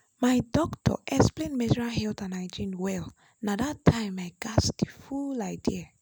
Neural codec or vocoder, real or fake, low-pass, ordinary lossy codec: none; real; none; none